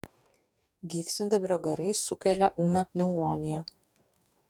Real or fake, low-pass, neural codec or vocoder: fake; 19.8 kHz; codec, 44.1 kHz, 2.6 kbps, DAC